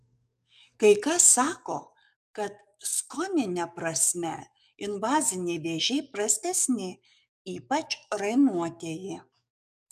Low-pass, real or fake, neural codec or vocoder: 14.4 kHz; fake; codec, 44.1 kHz, 7.8 kbps, DAC